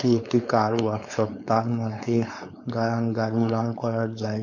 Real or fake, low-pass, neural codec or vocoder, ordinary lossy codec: fake; 7.2 kHz; codec, 16 kHz, 4.8 kbps, FACodec; MP3, 48 kbps